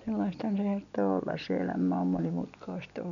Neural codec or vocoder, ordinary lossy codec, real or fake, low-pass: none; none; real; 7.2 kHz